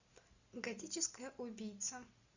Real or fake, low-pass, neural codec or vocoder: real; 7.2 kHz; none